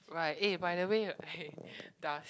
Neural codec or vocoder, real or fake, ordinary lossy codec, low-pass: none; real; none; none